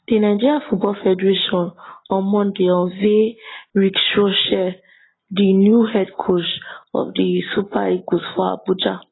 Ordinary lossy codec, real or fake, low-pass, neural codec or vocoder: AAC, 16 kbps; real; 7.2 kHz; none